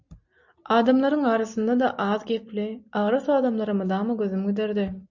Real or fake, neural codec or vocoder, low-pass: real; none; 7.2 kHz